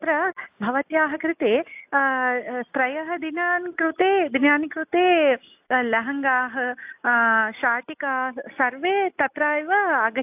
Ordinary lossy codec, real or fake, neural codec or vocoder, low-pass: AAC, 32 kbps; real; none; 3.6 kHz